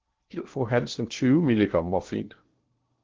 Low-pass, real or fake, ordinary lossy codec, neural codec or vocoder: 7.2 kHz; fake; Opus, 16 kbps; codec, 16 kHz in and 24 kHz out, 0.6 kbps, FocalCodec, streaming, 2048 codes